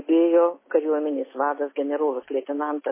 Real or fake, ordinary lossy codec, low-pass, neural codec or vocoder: real; MP3, 16 kbps; 3.6 kHz; none